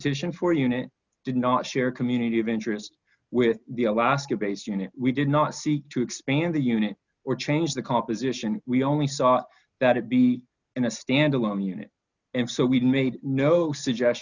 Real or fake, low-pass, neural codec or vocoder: real; 7.2 kHz; none